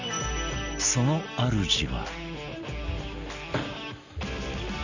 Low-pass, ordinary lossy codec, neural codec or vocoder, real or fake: 7.2 kHz; none; none; real